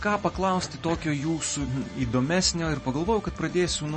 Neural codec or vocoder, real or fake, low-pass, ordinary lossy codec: none; real; 10.8 kHz; MP3, 32 kbps